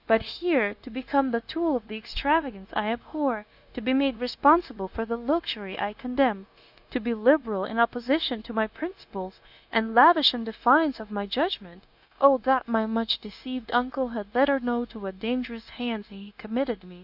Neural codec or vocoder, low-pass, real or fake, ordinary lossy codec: codec, 16 kHz, 0.9 kbps, LongCat-Audio-Codec; 5.4 kHz; fake; AAC, 48 kbps